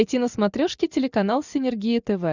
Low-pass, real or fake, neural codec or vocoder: 7.2 kHz; real; none